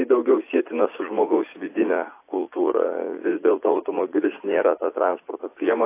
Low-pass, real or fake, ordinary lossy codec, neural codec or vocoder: 3.6 kHz; fake; AAC, 24 kbps; vocoder, 44.1 kHz, 80 mel bands, Vocos